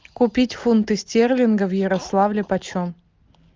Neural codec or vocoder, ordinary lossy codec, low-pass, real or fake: none; Opus, 32 kbps; 7.2 kHz; real